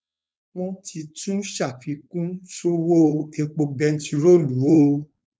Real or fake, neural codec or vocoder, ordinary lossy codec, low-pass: fake; codec, 16 kHz, 4.8 kbps, FACodec; none; none